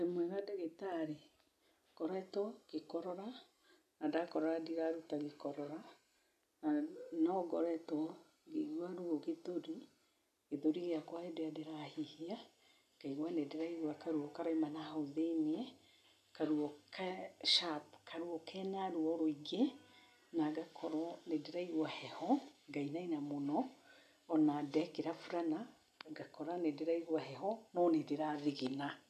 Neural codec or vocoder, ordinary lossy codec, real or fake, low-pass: none; none; real; 14.4 kHz